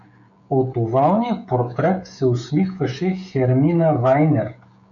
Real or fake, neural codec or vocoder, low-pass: fake; codec, 16 kHz, 16 kbps, FreqCodec, smaller model; 7.2 kHz